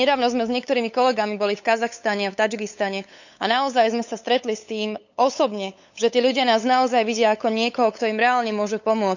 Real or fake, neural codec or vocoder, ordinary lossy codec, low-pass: fake; codec, 16 kHz, 8 kbps, FunCodec, trained on LibriTTS, 25 frames a second; none; 7.2 kHz